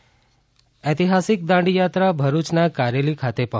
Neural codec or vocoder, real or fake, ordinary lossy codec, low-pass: none; real; none; none